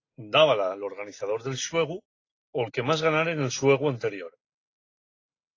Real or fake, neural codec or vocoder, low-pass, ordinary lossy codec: real; none; 7.2 kHz; AAC, 32 kbps